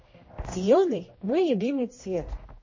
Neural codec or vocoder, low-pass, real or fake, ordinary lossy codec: codec, 16 kHz, 1 kbps, X-Codec, HuBERT features, trained on general audio; 7.2 kHz; fake; MP3, 32 kbps